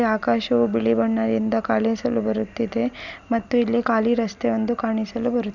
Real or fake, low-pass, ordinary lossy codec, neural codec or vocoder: real; 7.2 kHz; none; none